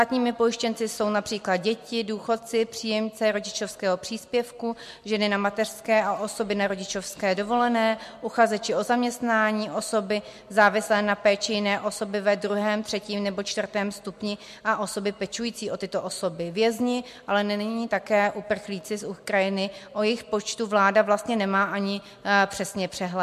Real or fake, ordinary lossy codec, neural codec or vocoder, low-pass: real; MP3, 64 kbps; none; 14.4 kHz